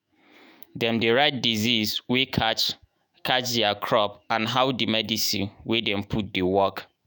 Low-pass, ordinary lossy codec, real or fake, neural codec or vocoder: none; none; fake; autoencoder, 48 kHz, 128 numbers a frame, DAC-VAE, trained on Japanese speech